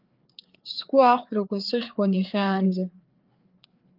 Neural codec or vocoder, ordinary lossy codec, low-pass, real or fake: codec, 16 kHz, 4 kbps, FreqCodec, larger model; Opus, 32 kbps; 5.4 kHz; fake